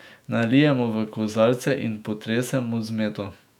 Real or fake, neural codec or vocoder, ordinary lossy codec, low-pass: fake; autoencoder, 48 kHz, 128 numbers a frame, DAC-VAE, trained on Japanese speech; none; 19.8 kHz